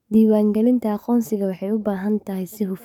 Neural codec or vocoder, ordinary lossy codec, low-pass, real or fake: codec, 44.1 kHz, 7.8 kbps, DAC; none; 19.8 kHz; fake